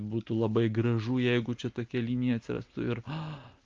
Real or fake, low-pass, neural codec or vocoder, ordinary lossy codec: real; 7.2 kHz; none; Opus, 32 kbps